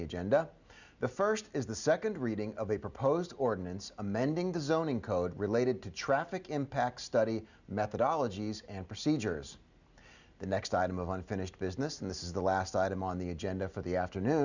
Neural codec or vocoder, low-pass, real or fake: none; 7.2 kHz; real